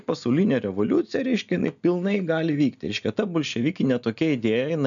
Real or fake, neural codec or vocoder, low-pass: real; none; 7.2 kHz